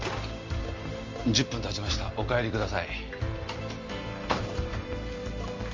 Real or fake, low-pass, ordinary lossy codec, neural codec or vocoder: real; 7.2 kHz; Opus, 32 kbps; none